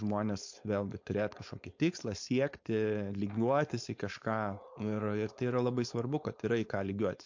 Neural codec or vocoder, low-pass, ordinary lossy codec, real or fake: codec, 16 kHz, 4.8 kbps, FACodec; 7.2 kHz; MP3, 48 kbps; fake